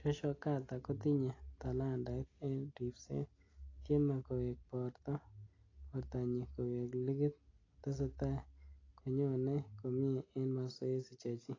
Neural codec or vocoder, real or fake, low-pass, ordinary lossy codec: none; real; 7.2 kHz; AAC, 32 kbps